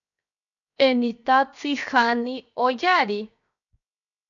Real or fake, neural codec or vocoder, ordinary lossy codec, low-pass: fake; codec, 16 kHz, 0.7 kbps, FocalCodec; MP3, 96 kbps; 7.2 kHz